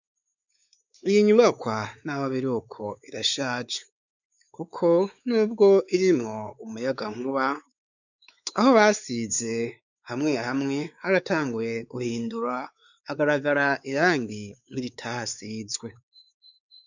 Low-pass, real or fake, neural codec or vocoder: 7.2 kHz; fake; codec, 16 kHz, 4 kbps, X-Codec, WavLM features, trained on Multilingual LibriSpeech